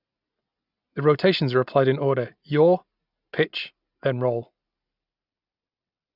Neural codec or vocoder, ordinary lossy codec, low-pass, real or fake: none; none; 5.4 kHz; real